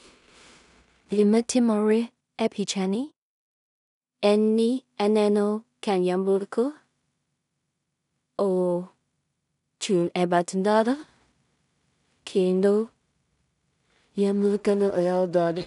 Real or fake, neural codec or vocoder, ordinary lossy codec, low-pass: fake; codec, 16 kHz in and 24 kHz out, 0.4 kbps, LongCat-Audio-Codec, two codebook decoder; none; 10.8 kHz